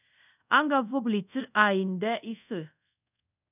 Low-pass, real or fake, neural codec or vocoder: 3.6 kHz; fake; codec, 24 kHz, 0.5 kbps, DualCodec